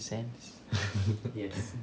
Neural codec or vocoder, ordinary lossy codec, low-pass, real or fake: none; none; none; real